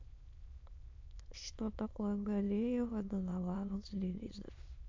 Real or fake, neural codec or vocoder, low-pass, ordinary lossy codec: fake; autoencoder, 22.05 kHz, a latent of 192 numbers a frame, VITS, trained on many speakers; 7.2 kHz; MP3, 48 kbps